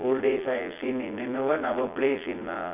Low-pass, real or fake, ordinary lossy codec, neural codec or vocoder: 3.6 kHz; fake; none; vocoder, 22.05 kHz, 80 mel bands, Vocos